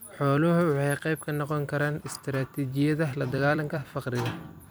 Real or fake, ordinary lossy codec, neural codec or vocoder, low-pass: fake; none; vocoder, 44.1 kHz, 128 mel bands every 256 samples, BigVGAN v2; none